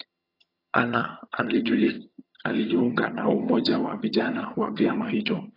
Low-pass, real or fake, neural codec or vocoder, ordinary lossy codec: 5.4 kHz; fake; vocoder, 22.05 kHz, 80 mel bands, HiFi-GAN; AAC, 24 kbps